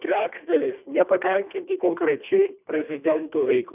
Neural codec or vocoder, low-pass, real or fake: codec, 24 kHz, 1.5 kbps, HILCodec; 3.6 kHz; fake